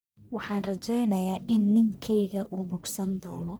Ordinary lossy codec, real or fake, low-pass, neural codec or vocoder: none; fake; none; codec, 44.1 kHz, 1.7 kbps, Pupu-Codec